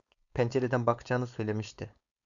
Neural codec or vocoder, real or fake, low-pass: codec, 16 kHz, 4.8 kbps, FACodec; fake; 7.2 kHz